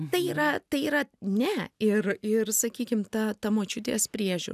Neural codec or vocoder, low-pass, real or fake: none; 14.4 kHz; real